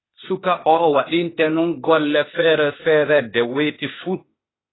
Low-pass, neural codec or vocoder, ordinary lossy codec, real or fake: 7.2 kHz; codec, 16 kHz, 0.8 kbps, ZipCodec; AAC, 16 kbps; fake